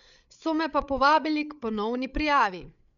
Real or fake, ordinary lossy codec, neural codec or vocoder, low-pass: fake; none; codec, 16 kHz, 8 kbps, FreqCodec, larger model; 7.2 kHz